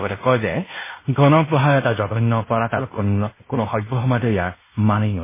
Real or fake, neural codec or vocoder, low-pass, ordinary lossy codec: fake; codec, 16 kHz in and 24 kHz out, 0.9 kbps, LongCat-Audio-Codec, fine tuned four codebook decoder; 3.6 kHz; MP3, 16 kbps